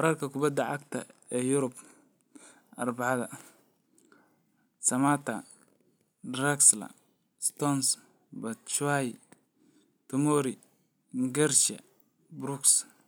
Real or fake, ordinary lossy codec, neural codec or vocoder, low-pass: real; none; none; none